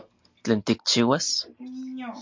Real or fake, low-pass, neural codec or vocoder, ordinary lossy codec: real; 7.2 kHz; none; MP3, 48 kbps